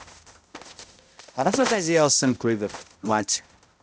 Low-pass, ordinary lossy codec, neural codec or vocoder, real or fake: none; none; codec, 16 kHz, 0.5 kbps, X-Codec, HuBERT features, trained on balanced general audio; fake